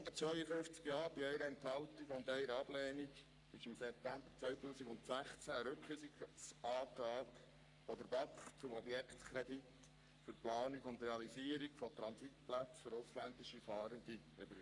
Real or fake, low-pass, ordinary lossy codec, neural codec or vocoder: fake; 10.8 kHz; none; codec, 44.1 kHz, 3.4 kbps, Pupu-Codec